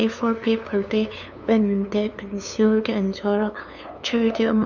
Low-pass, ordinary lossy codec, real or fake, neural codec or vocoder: 7.2 kHz; none; fake; codec, 16 kHz, 2 kbps, FunCodec, trained on LibriTTS, 25 frames a second